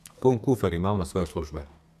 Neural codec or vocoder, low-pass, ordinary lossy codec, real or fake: codec, 32 kHz, 1.9 kbps, SNAC; 14.4 kHz; none; fake